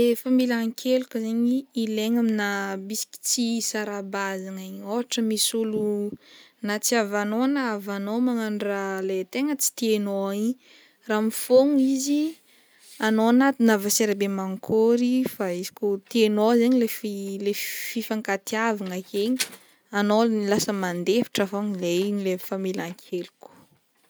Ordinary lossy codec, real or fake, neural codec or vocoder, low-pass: none; real; none; none